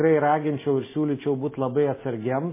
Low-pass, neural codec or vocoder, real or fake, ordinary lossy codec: 3.6 kHz; none; real; MP3, 16 kbps